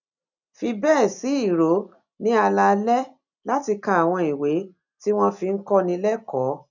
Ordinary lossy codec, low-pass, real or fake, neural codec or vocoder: none; 7.2 kHz; real; none